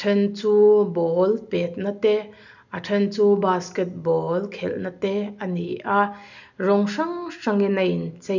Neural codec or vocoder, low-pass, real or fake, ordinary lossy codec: none; 7.2 kHz; real; none